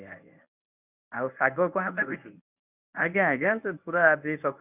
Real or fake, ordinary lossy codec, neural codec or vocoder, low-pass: fake; none; codec, 24 kHz, 0.9 kbps, WavTokenizer, medium speech release version 1; 3.6 kHz